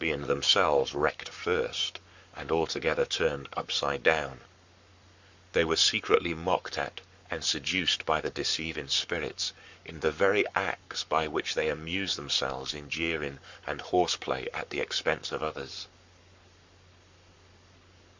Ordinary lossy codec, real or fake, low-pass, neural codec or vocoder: Opus, 64 kbps; fake; 7.2 kHz; codec, 44.1 kHz, 7.8 kbps, Pupu-Codec